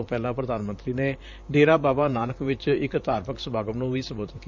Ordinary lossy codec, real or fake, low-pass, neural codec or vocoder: none; fake; 7.2 kHz; vocoder, 44.1 kHz, 128 mel bands, Pupu-Vocoder